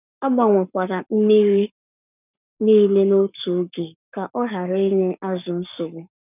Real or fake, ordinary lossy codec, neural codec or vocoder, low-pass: fake; none; codec, 44.1 kHz, 7.8 kbps, Pupu-Codec; 3.6 kHz